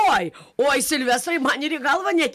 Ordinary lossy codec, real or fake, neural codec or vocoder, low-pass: MP3, 96 kbps; real; none; 14.4 kHz